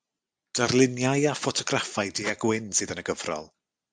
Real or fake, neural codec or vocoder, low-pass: real; none; 9.9 kHz